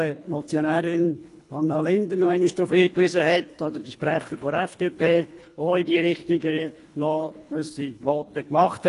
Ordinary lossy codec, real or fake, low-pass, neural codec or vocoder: AAC, 48 kbps; fake; 10.8 kHz; codec, 24 kHz, 1.5 kbps, HILCodec